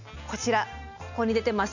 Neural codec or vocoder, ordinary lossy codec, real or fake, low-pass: none; none; real; 7.2 kHz